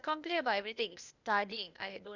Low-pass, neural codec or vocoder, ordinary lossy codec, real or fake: 7.2 kHz; codec, 16 kHz, 0.8 kbps, ZipCodec; none; fake